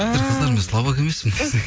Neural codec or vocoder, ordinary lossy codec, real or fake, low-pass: none; none; real; none